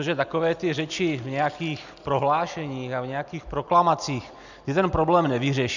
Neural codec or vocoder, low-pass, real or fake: none; 7.2 kHz; real